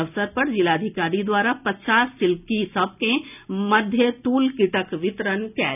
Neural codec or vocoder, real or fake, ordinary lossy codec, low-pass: none; real; none; 3.6 kHz